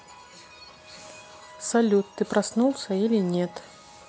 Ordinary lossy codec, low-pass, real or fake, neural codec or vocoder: none; none; real; none